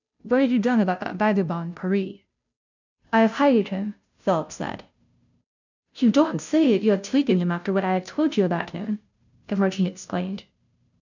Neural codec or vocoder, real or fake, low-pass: codec, 16 kHz, 0.5 kbps, FunCodec, trained on Chinese and English, 25 frames a second; fake; 7.2 kHz